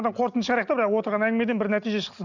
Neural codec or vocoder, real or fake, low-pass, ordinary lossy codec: none; real; 7.2 kHz; none